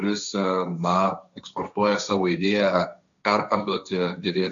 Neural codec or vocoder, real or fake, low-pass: codec, 16 kHz, 1.1 kbps, Voila-Tokenizer; fake; 7.2 kHz